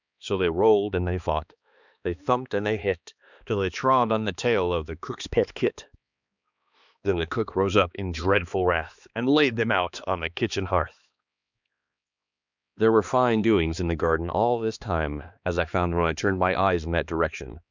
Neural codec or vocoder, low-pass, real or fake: codec, 16 kHz, 2 kbps, X-Codec, HuBERT features, trained on balanced general audio; 7.2 kHz; fake